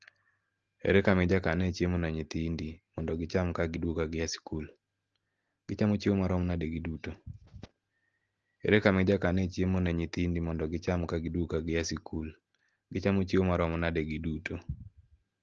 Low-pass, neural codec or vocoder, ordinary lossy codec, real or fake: 7.2 kHz; none; Opus, 16 kbps; real